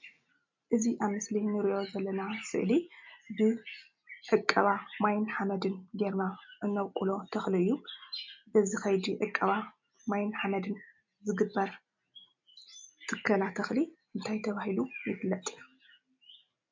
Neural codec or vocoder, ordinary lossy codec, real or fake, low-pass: none; MP3, 48 kbps; real; 7.2 kHz